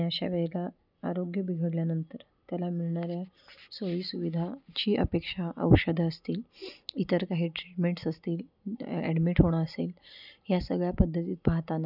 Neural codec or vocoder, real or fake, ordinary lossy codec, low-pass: none; real; none; 5.4 kHz